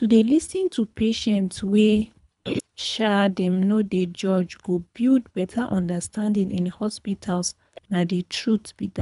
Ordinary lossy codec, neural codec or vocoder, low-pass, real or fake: none; codec, 24 kHz, 3 kbps, HILCodec; 10.8 kHz; fake